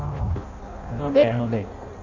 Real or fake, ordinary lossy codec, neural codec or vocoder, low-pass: fake; none; codec, 16 kHz in and 24 kHz out, 0.6 kbps, FireRedTTS-2 codec; 7.2 kHz